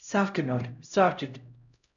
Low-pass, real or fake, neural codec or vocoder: 7.2 kHz; fake; codec, 16 kHz, 0.5 kbps, X-Codec, HuBERT features, trained on LibriSpeech